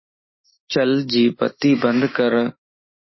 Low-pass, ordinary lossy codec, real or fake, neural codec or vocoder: 7.2 kHz; MP3, 24 kbps; fake; autoencoder, 48 kHz, 128 numbers a frame, DAC-VAE, trained on Japanese speech